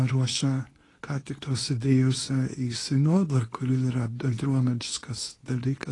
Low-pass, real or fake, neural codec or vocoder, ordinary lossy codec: 10.8 kHz; fake; codec, 24 kHz, 0.9 kbps, WavTokenizer, medium speech release version 2; AAC, 32 kbps